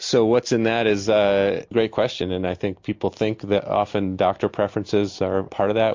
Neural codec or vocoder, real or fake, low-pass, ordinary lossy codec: none; real; 7.2 kHz; MP3, 48 kbps